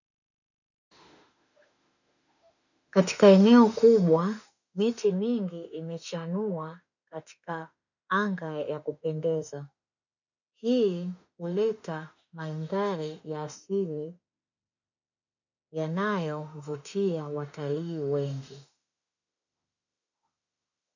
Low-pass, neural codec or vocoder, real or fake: 7.2 kHz; autoencoder, 48 kHz, 32 numbers a frame, DAC-VAE, trained on Japanese speech; fake